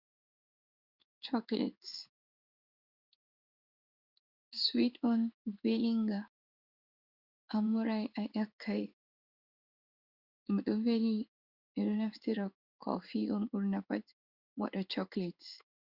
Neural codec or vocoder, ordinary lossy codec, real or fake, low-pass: codec, 16 kHz in and 24 kHz out, 1 kbps, XY-Tokenizer; Opus, 64 kbps; fake; 5.4 kHz